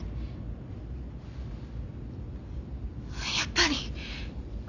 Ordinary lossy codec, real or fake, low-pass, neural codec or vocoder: none; real; 7.2 kHz; none